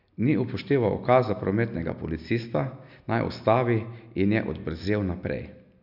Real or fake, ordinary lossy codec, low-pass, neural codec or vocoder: real; none; 5.4 kHz; none